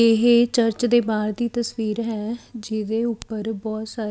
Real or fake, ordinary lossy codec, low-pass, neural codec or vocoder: real; none; none; none